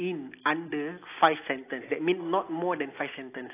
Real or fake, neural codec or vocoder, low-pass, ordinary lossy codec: real; none; 3.6 kHz; AAC, 24 kbps